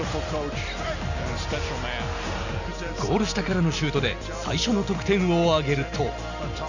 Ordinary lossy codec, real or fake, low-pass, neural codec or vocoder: none; real; 7.2 kHz; none